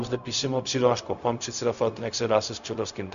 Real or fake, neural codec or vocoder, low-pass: fake; codec, 16 kHz, 0.4 kbps, LongCat-Audio-Codec; 7.2 kHz